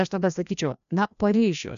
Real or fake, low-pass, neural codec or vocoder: fake; 7.2 kHz; codec, 16 kHz, 1 kbps, FreqCodec, larger model